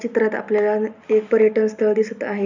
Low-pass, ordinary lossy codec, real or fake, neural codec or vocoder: 7.2 kHz; none; real; none